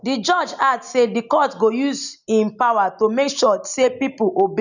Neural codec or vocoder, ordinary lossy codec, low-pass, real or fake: none; none; 7.2 kHz; real